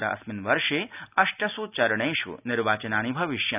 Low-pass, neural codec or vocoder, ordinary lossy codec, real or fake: 3.6 kHz; none; none; real